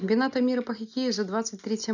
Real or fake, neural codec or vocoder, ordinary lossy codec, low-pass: real; none; none; 7.2 kHz